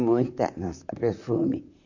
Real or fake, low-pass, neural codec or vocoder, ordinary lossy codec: fake; 7.2 kHz; vocoder, 44.1 kHz, 128 mel bands, Pupu-Vocoder; none